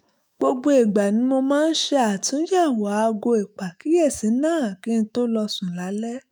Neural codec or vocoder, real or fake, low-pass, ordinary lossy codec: autoencoder, 48 kHz, 128 numbers a frame, DAC-VAE, trained on Japanese speech; fake; none; none